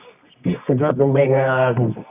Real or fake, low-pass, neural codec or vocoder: fake; 3.6 kHz; codec, 24 kHz, 0.9 kbps, WavTokenizer, medium music audio release